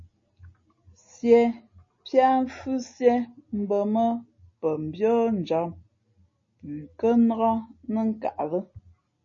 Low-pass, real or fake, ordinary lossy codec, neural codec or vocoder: 7.2 kHz; real; MP3, 32 kbps; none